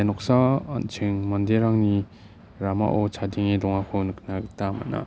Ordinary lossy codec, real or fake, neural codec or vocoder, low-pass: none; real; none; none